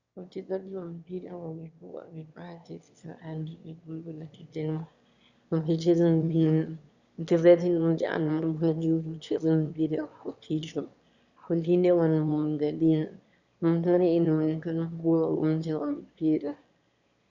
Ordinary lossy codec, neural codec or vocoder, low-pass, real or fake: Opus, 64 kbps; autoencoder, 22.05 kHz, a latent of 192 numbers a frame, VITS, trained on one speaker; 7.2 kHz; fake